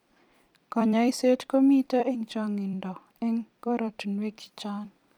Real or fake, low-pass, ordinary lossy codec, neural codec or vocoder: fake; 19.8 kHz; none; vocoder, 44.1 kHz, 128 mel bands every 256 samples, BigVGAN v2